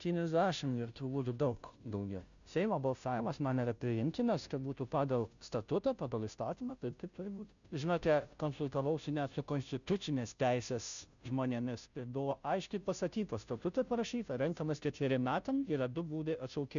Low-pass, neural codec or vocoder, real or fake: 7.2 kHz; codec, 16 kHz, 0.5 kbps, FunCodec, trained on Chinese and English, 25 frames a second; fake